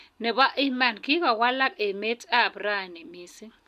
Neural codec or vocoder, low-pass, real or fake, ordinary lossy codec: none; 14.4 kHz; real; none